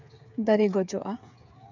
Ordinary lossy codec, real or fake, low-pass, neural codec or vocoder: none; fake; 7.2 kHz; codec, 16 kHz, 8 kbps, FreqCodec, smaller model